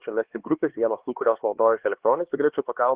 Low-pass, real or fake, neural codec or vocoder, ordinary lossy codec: 3.6 kHz; fake; codec, 16 kHz, 2 kbps, X-Codec, HuBERT features, trained on LibriSpeech; Opus, 16 kbps